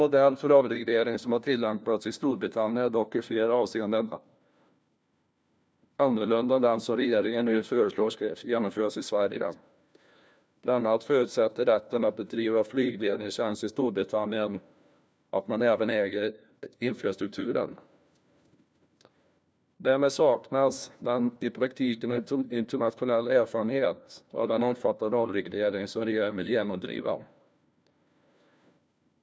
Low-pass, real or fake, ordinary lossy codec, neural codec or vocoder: none; fake; none; codec, 16 kHz, 1 kbps, FunCodec, trained on LibriTTS, 50 frames a second